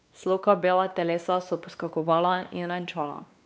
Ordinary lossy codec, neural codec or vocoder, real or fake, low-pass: none; codec, 16 kHz, 2 kbps, X-Codec, WavLM features, trained on Multilingual LibriSpeech; fake; none